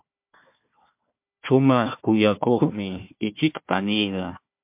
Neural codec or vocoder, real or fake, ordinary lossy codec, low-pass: codec, 16 kHz, 1 kbps, FunCodec, trained on Chinese and English, 50 frames a second; fake; MP3, 32 kbps; 3.6 kHz